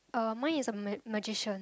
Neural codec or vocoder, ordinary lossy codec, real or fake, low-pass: none; none; real; none